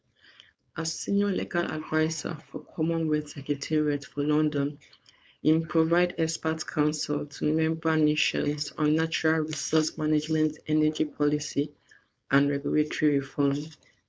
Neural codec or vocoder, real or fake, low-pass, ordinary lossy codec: codec, 16 kHz, 4.8 kbps, FACodec; fake; none; none